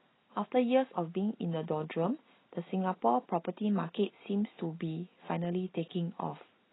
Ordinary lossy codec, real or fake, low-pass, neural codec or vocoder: AAC, 16 kbps; real; 7.2 kHz; none